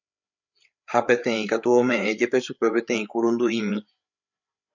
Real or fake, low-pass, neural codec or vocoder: fake; 7.2 kHz; codec, 16 kHz, 8 kbps, FreqCodec, larger model